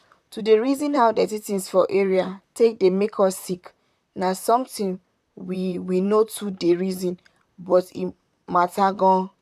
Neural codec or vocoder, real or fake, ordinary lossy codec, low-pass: vocoder, 44.1 kHz, 128 mel bands, Pupu-Vocoder; fake; none; 14.4 kHz